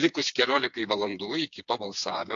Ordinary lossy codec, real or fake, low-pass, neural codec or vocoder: AAC, 64 kbps; fake; 7.2 kHz; codec, 16 kHz, 4 kbps, FreqCodec, smaller model